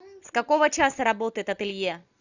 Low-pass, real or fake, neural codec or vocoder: 7.2 kHz; real; none